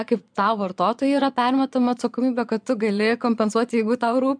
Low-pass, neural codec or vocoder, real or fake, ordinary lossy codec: 9.9 kHz; vocoder, 22.05 kHz, 80 mel bands, Vocos; fake; MP3, 96 kbps